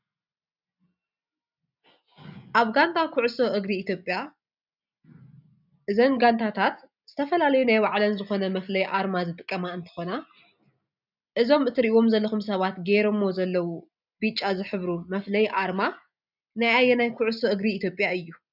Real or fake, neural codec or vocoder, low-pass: real; none; 5.4 kHz